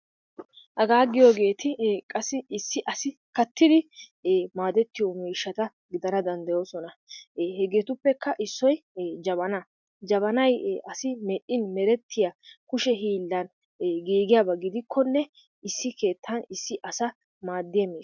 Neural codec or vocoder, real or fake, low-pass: none; real; 7.2 kHz